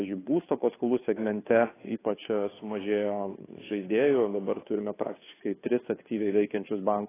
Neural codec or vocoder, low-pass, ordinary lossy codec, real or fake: codec, 16 kHz, 2 kbps, FunCodec, trained on Chinese and English, 25 frames a second; 3.6 kHz; AAC, 16 kbps; fake